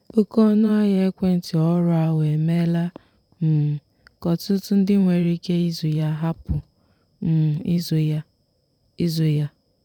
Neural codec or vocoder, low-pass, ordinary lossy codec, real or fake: vocoder, 44.1 kHz, 128 mel bands every 512 samples, BigVGAN v2; 19.8 kHz; none; fake